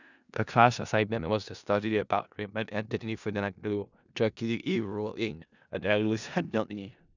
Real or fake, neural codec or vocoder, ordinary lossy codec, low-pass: fake; codec, 16 kHz in and 24 kHz out, 0.4 kbps, LongCat-Audio-Codec, four codebook decoder; none; 7.2 kHz